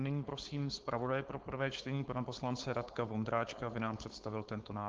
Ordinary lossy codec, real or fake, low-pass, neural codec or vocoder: Opus, 16 kbps; fake; 7.2 kHz; codec, 16 kHz, 4.8 kbps, FACodec